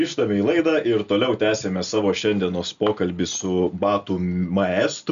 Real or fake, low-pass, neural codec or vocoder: real; 7.2 kHz; none